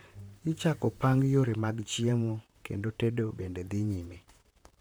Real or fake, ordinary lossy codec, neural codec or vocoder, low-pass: fake; none; vocoder, 44.1 kHz, 128 mel bands, Pupu-Vocoder; none